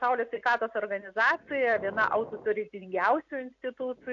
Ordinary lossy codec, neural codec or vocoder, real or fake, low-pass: AAC, 48 kbps; none; real; 7.2 kHz